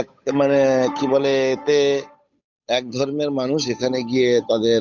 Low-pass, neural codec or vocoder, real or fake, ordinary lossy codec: 7.2 kHz; codec, 16 kHz, 8 kbps, FunCodec, trained on Chinese and English, 25 frames a second; fake; none